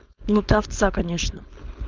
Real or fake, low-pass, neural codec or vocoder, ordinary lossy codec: fake; 7.2 kHz; codec, 16 kHz, 4.8 kbps, FACodec; Opus, 24 kbps